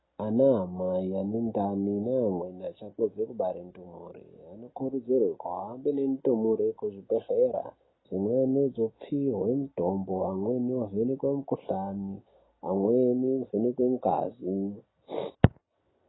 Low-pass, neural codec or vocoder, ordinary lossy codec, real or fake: 7.2 kHz; none; AAC, 16 kbps; real